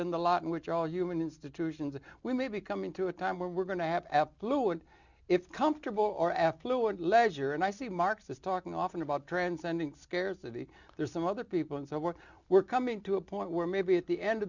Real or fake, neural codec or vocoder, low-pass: real; none; 7.2 kHz